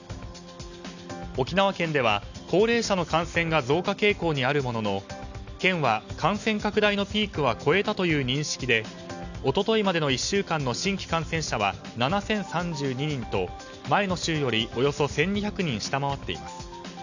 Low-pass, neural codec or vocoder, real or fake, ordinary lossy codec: 7.2 kHz; none; real; none